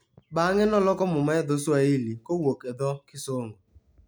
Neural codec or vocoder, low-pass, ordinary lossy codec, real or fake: none; none; none; real